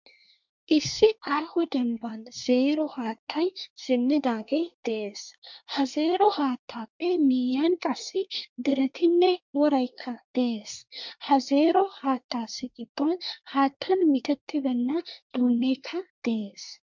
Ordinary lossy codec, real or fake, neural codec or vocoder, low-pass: MP3, 64 kbps; fake; codec, 24 kHz, 1 kbps, SNAC; 7.2 kHz